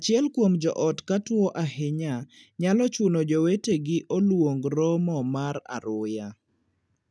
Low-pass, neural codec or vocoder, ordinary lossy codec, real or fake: none; none; none; real